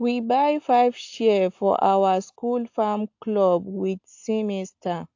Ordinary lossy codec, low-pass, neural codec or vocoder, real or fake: AAC, 48 kbps; 7.2 kHz; none; real